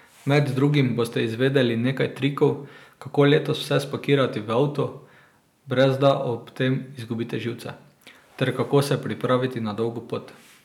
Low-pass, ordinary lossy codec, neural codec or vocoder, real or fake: 19.8 kHz; none; none; real